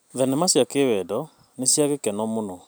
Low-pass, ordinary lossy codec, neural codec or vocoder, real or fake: none; none; none; real